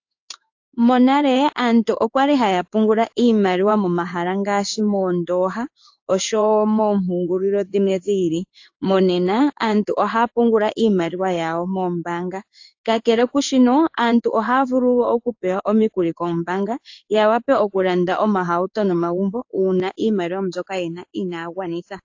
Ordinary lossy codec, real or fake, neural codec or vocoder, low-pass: AAC, 48 kbps; fake; codec, 16 kHz in and 24 kHz out, 1 kbps, XY-Tokenizer; 7.2 kHz